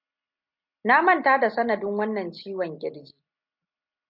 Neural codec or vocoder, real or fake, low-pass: none; real; 5.4 kHz